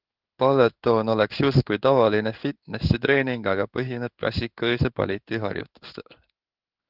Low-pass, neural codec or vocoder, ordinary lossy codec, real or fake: 5.4 kHz; codec, 16 kHz in and 24 kHz out, 1 kbps, XY-Tokenizer; Opus, 32 kbps; fake